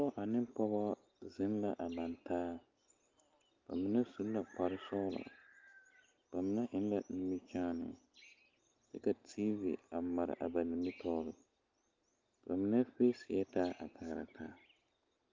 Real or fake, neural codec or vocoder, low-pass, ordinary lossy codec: real; none; 7.2 kHz; Opus, 32 kbps